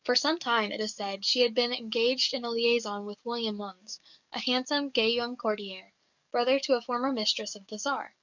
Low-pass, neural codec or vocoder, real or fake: 7.2 kHz; codec, 44.1 kHz, 7.8 kbps, DAC; fake